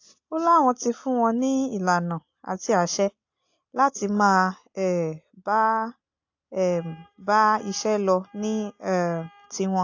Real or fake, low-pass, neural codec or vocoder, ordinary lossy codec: real; 7.2 kHz; none; AAC, 48 kbps